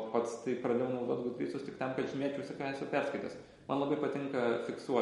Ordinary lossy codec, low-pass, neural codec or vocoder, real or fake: MP3, 48 kbps; 19.8 kHz; none; real